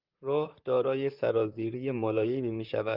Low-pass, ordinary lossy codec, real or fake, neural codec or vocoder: 5.4 kHz; Opus, 24 kbps; fake; vocoder, 44.1 kHz, 128 mel bands, Pupu-Vocoder